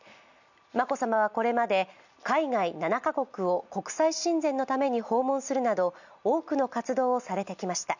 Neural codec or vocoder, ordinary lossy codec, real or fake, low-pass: none; none; real; 7.2 kHz